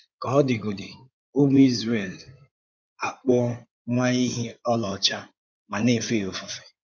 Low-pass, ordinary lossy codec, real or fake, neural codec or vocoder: 7.2 kHz; none; fake; codec, 16 kHz in and 24 kHz out, 2.2 kbps, FireRedTTS-2 codec